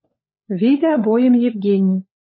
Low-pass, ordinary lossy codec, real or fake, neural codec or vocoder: 7.2 kHz; MP3, 24 kbps; fake; codec, 16 kHz, 4 kbps, FunCodec, trained on LibriTTS, 50 frames a second